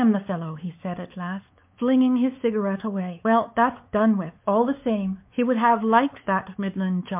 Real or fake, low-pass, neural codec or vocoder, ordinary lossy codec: fake; 3.6 kHz; codec, 16 kHz, 4 kbps, X-Codec, WavLM features, trained on Multilingual LibriSpeech; MP3, 32 kbps